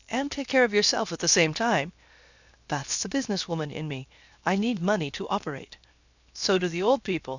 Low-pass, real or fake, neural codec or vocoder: 7.2 kHz; fake; codec, 16 kHz, 0.7 kbps, FocalCodec